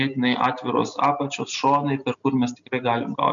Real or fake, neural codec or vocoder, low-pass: real; none; 7.2 kHz